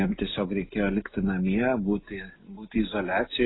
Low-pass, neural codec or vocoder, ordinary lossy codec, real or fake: 7.2 kHz; none; AAC, 16 kbps; real